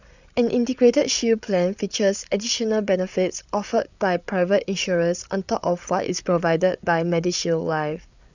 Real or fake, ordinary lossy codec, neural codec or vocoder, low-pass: fake; none; codec, 16 kHz, 8 kbps, FreqCodec, larger model; 7.2 kHz